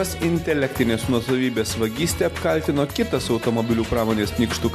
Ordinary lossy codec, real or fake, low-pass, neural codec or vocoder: MP3, 96 kbps; real; 14.4 kHz; none